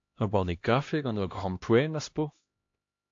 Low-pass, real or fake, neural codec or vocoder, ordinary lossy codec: 7.2 kHz; fake; codec, 16 kHz, 1 kbps, X-Codec, HuBERT features, trained on LibriSpeech; AAC, 48 kbps